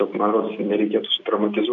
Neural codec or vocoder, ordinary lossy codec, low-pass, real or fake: none; MP3, 64 kbps; 7.2 kHz; real